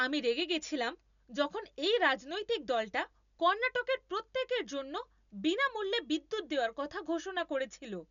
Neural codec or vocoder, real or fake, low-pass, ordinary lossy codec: none; real; 7.2 kHz; none